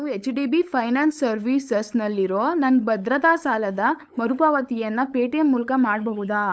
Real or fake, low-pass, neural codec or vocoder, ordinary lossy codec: fake; none; codec, 16 kHz, 8 kbps, FunCodec, trained on LibriTTS, 25 frames a second; none